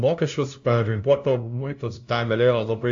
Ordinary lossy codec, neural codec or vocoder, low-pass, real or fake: AAC, 64 kbps; codec, 16 kHz, 0.5 kbps, FunCodec, trained on LibriTTS, 25 frames a second; 7.2 kHz; fake